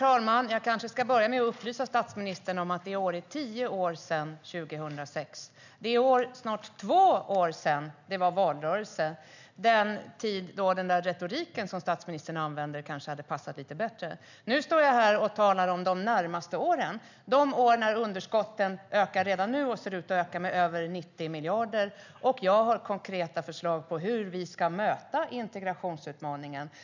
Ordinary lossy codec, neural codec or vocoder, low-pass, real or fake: none; none; 7.2 kHz; real